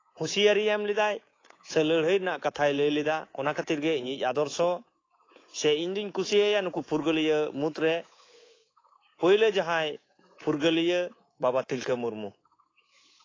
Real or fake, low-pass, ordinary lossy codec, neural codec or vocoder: fake; 7.2 kHz; AAC, 32 kbps; codec, 24 kHz, 3.1 kbps, DualCodec